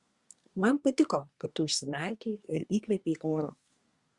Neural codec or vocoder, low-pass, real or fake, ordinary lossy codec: codec, 24 kHz, 1 kbps, SNAC; 10.8 kHz; fake; Opus, 64 kbps